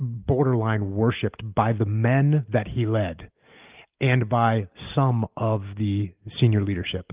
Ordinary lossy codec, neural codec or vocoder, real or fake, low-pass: Opus, 32 kbps; none; real; 3.6 kHz